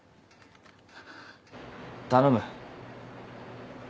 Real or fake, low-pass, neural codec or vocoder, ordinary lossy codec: real; none; none; none